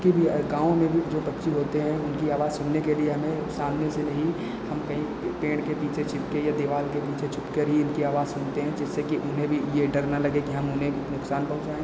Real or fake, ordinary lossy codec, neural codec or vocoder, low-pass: real; none; none; none